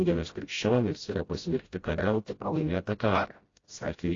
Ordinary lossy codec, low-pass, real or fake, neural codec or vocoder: AAC, 32 kbps; 7.2 kHz; fake; codec, 16 kHz, 0.5 kbps, FreqCodec, smaller model